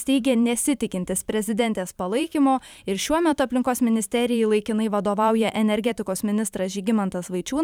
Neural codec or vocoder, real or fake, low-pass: vocoder, 44.1 kHz, 128 mel bands every 512 samples, BigVGAN v2; fake; 19.8 kHz